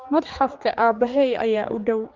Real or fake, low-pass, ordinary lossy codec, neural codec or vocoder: fake; 7.2 kHz; Opus, 32 kbps; codec, 16 kHz, 4 kbps, X-Codec, HuBERT features, trained on general audio